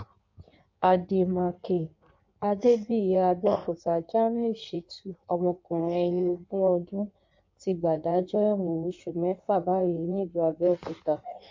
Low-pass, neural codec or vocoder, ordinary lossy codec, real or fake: 7.2 kHz; codec, 16 kHz in and 24 kHz out, 1.1 kbps, FireRedTTS-2 codec; MP3, 48 kbps; fake